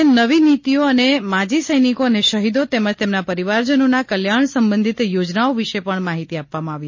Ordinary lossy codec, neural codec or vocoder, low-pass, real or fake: MP3, 32 kbps; none; 7.2 kHz; real